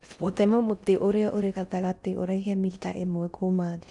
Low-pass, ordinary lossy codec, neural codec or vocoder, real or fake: 10.8 kHz; none; codec, 16 kHz in and 24 kHz out, 0.6 kbps, FocalCodec, streaming, 4096 codes; fake